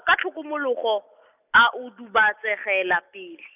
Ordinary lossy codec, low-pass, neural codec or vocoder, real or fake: none; 3.6 kHz; none; real